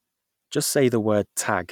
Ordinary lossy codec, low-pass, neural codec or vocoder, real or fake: none; 19.8 kHz; none; real